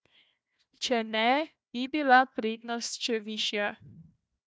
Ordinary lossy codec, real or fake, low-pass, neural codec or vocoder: none; fake; none; codec, 16 kHz, 1 kbps, FunCodec, trained on Chinese and English, 50 frames a second